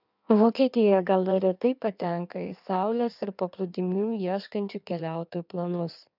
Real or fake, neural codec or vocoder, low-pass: fake; codec, 16 kHz in and 24 kHz out, 1.1 kbps, FireRedTTS-2 codec; 5.4 kHz